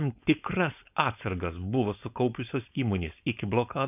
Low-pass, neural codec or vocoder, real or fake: 3.6 kHz; codec, 16 kHz, 4.8 kbps, FACodec; fake